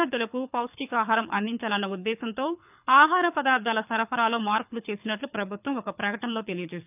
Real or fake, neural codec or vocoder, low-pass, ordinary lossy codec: fake; codec, 24 kHz, 6 kbps, HILCodec; 3.6 kHz; none